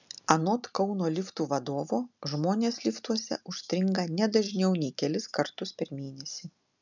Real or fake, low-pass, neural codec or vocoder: real; 7.2 kHz; none